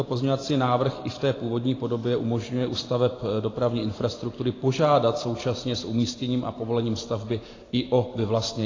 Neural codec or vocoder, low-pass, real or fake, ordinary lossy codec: vocoder, 44.1 kHz, 128 mel bands every 512 samples, BigVGAN v2; 7.2 kHz; fake; AAC, 32 kbps